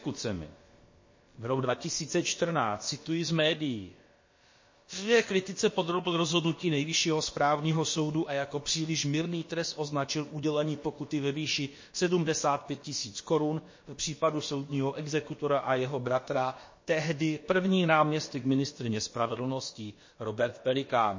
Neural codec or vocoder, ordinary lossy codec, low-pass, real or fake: codec, 16 kHz, about 1 kbps, DyCAST, with the encoder's durations; MP3, 32 kbps; 7.2 kHz; fake